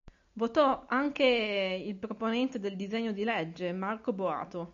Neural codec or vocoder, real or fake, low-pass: none; real; 7.2 kHz